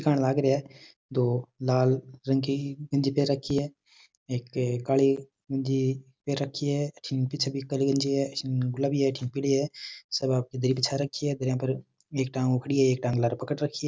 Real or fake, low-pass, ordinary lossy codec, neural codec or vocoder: real; none; none; none